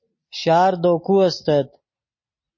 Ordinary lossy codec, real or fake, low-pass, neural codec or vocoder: MP3, 32 kbps; real; 7.2 kHz; none